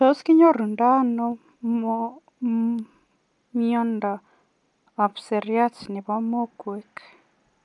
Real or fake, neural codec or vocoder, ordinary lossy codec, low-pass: real; none; none; 10.8 kHz